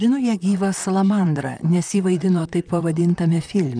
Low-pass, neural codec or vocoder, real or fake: 9.9 kHz; vocoder, 44.1 kHz, 128 mel bands, Pupu-Vocoder; fake